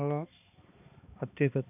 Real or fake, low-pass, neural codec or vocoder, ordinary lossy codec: fake; 3.6 kHz; codec, 16 kHz, 2 kbps, X-Codec, HuBERT features, trained on balanced general audio; none